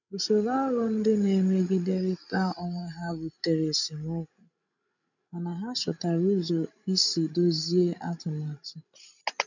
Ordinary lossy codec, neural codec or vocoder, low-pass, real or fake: none; codec, 16 kHz, 16 kbps, FreqCodec, larger model; 7.2 kHz; fake